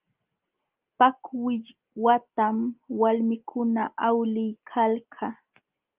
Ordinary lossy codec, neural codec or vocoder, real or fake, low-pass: Opus, 24 kbps; none; real; 3.6 kHz